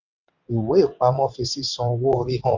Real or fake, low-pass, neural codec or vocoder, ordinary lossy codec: fake; 7.2 kHz; vocoder, 22.05 kHz, 80 mel bands, WaveNeXt; none